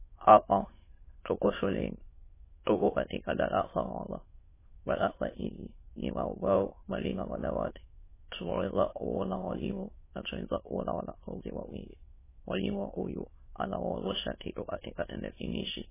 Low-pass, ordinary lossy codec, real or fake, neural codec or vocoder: 3.6 kHz; MP3, 16 kbps; fake; autoencoder, 22.05 kHz, a latent of 192 numbers a frame, VITS, trained on many speakers